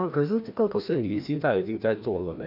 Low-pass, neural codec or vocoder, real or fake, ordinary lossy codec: 5.4 kHz; codec, 16 kHz, 1 kbps, FreqCodec, larger model; fake; none